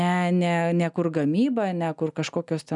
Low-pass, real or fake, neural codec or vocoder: 10.8 kHz; real; none